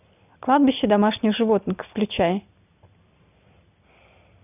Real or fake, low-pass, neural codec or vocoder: real; 3.6 kHz; none